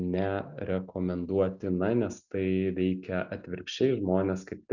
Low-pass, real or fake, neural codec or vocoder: 7.2 kHz; real; none